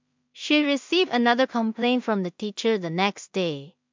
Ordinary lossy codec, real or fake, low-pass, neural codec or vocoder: MP3, 64 kbps; fake; 7.2 kHz; codec, 16 kHz in and 24 kHz out, 0.4 kbps, LongCat-Audio-Codec, two codebook decoder